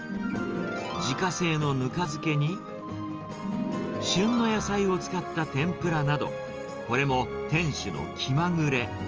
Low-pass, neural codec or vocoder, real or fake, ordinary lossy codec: 7.2 kHz; none; real; Opus, 24 kbps